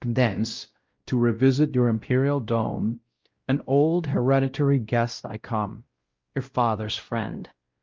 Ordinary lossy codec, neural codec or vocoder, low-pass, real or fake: Opus, 32 kbps; codec, 16 kHz, 0.5 kbps, X-Codec, WavLM features, trained on Multilingual LibriSpeech; 7.2 kHz; fake